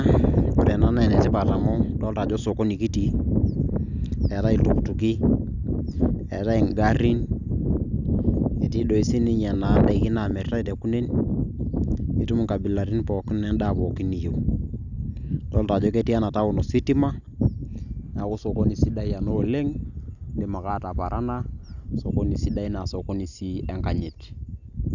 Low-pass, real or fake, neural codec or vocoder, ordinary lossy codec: 7.2 kHz; real; none; none